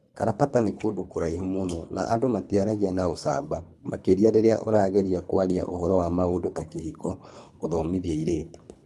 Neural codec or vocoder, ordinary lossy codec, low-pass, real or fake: codec, 24 kHz, 3 kbps, HILCodec; none; none; fake